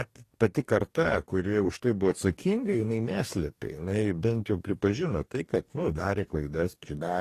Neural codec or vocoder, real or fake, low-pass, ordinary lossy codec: codec, 44.1 kHz, 2.6 kbps, DAC; fake; 14.4 kHz; AAC, 48 kbps